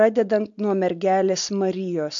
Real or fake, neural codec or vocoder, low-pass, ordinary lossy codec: real; none; 7.2 kHz; AAC, 64 kbps